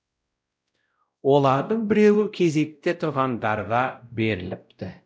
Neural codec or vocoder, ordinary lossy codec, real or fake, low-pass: codec, 16 kHz, 0.5 kbps, X-Codec, WavLM features, trained on Multilingual LibriSpeech; none; fake; none